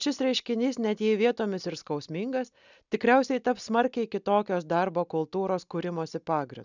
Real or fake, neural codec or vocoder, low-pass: real; none; 7.2 kHz